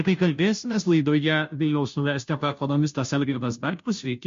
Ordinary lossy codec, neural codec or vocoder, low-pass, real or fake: MP3, 48 kbps; codec, 16 kHz, 0.5 kbps, FunCodec, trained on Chinese and English, 25 frames a second; 7.2 kHz; fake